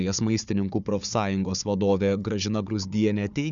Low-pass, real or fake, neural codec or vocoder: 7.2 kHz; fake; codec, 16 kHz, 4 kbps, FunCodec, trained on Chinese and English, 50 frames a second